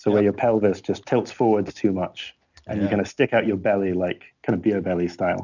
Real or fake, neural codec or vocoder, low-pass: real; none; 7.2 kHz